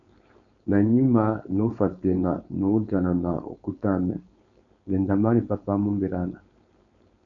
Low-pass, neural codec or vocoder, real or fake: 7.2 kHz; codec, 16 kHz, 4.8 kbps, FACodec; fake